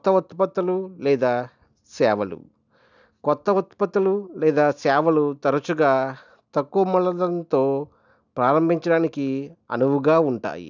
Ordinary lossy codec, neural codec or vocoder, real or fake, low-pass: none; none; real; 7.2 kHz